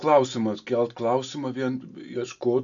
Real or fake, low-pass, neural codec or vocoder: real; 7.2 kHz; none